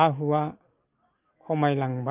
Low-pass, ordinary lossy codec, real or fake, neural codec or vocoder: 3.6 kHz; Opus, 24 kbps; real; none